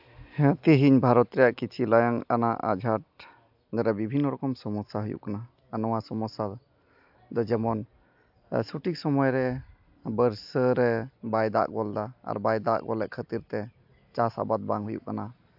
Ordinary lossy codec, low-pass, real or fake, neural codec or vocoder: AAC, 48 kbps; 5.4 kHz; real; none